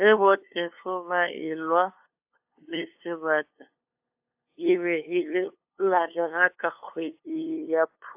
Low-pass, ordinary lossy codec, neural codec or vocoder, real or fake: 3.6 kHz; AAC, 32 kbps; codec, 16 kHz, 2 kbps, FunCodec, trained on LibriTTS, 25 frames a second; fake